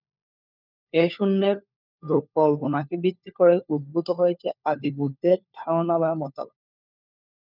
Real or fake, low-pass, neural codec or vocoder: fake; 5.4 kHz; codec, 16 kHz, 4 kbps, FunCodec, trained on LibriTTS, 50 frames a second